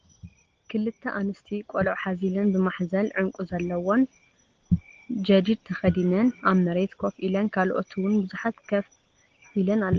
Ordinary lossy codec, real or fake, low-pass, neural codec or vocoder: Opus, 16 kbps; real; 7.2 kHz; none